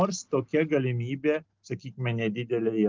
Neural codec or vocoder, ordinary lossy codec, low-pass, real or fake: none; Opus, 24 kbps; 7.2 kHz; real